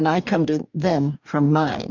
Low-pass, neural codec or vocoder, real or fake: 7.2 kHz; codec, 44.1 kHz, 2.6 kbps, DAC; fake